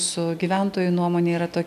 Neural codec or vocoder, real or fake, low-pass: none; real; 14.4 kHz